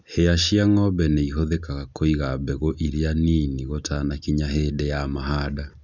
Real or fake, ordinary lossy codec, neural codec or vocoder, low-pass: real; none; none; 7.2 kHz